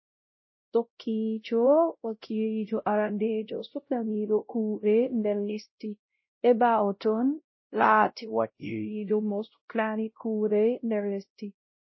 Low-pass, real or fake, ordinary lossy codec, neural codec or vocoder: 7.2 kHz; fake; MP3, 24 kbps; codec, 16 kHz, 0.5 kbps, X-Codec, WavLM features, trained on Multilingual LibriSpeech